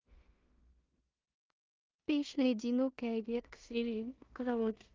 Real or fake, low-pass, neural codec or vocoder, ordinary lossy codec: fake; 7.2 kHz; codec, 16 kHz in and 24 kHz out, 0.4 kbps, LongCat-Audio-Codec, two codebook decoder; Opus, 32 kbps